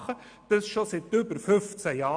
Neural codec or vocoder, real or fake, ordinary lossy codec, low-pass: none; real; MP3, 96 kbps; 9.9 kHz